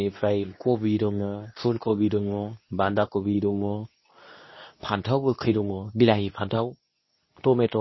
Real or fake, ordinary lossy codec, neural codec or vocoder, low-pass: fake; MP3, 24 kbps; codec, 24 kHz, 0.9 kbps, WavTokenizer, medium speech release version 2; 7.2 kHz